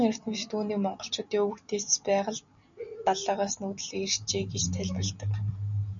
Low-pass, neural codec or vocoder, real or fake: 7.2 kHz; none; real